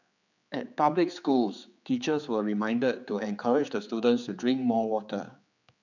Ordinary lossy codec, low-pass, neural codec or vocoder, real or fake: none; 7.2 kHz; codec, 16 kHz, 4 kbps, X-Codec, HuBERT features, trained on general audio; fake